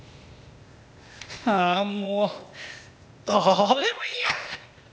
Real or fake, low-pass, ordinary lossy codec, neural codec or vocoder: fake; none; none; codec, 16 kHz, 0.8 kbps, ZipCodec